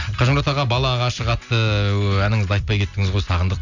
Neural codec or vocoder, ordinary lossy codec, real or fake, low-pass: none; none; real; 7.2 kHz